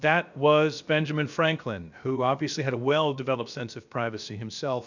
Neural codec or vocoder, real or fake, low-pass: codec, 16 kHz, about 1 kbps, DyCAST, with the encoder's durations; fake; 7.2 kHz